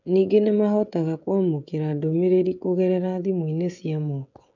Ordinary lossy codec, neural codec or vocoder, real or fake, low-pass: none; codec, 16 kHz, 16 kbps, FreqCodec, smaller model; fake; 7.2 kHz